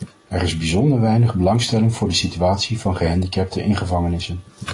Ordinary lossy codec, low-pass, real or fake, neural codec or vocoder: AAC, 32 kbps; 10.8 kHz; real; none